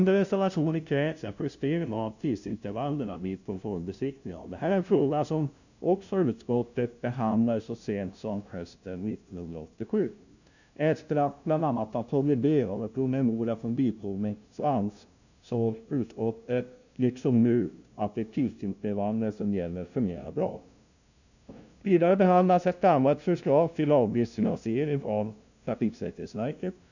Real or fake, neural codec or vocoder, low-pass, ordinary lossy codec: fake; codec, 16 kHz, 0.5 kbps, FunCodec, trained on LibriTTS, 25 frames a second; 7.2 kHz; none